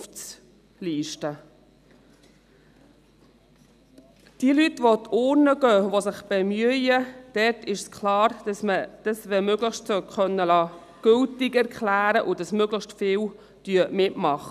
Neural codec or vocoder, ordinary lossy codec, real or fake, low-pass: none; none; real; 14.4 kHz